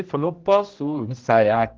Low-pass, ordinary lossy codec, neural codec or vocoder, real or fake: 7.2 kHz; Opus, 16 kbps; codec, 16 kHz, 1 kbps, X-Codec, HuBERT features, trained on general audio; fake